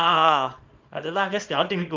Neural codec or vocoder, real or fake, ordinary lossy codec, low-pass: codec, 24 kHz, 0.9 kbps, WavTokenizer, small release; fake; Opus, 24 kbps; 7.2 kHz